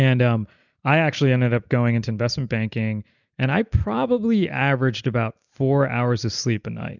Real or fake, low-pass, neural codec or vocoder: real; 7.2 kHz; none